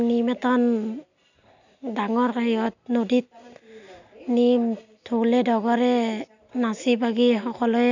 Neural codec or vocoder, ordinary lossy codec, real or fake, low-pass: none; none; real; 7.2 kHz